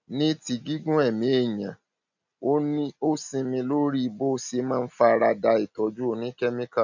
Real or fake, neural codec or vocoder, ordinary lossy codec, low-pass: real; none; none; 7.2 kHz